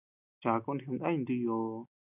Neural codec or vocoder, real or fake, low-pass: none; real; 3.6 kHz